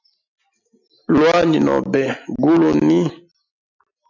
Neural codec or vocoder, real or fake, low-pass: none; real; 7.2 kHz